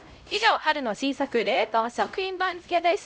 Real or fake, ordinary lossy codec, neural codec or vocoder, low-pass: fake; none; codec, 16 kHz, 0.5 kbps, X-Codec, HuBERT features, trained on LibriSpeech; none